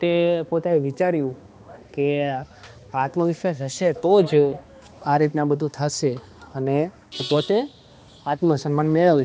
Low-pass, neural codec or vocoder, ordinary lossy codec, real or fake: none; codec, 16 kHz, 2 kbps, X-Codec, HuBERT features, trained on balanced general audio; none; fake